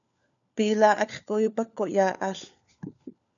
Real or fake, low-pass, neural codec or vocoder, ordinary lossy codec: fake; 7.2 kHz; codec, 16 kHz, 4 kbps, FunCodec, trained on LibriTTS, 50 frames a second; MP3, 96 kbps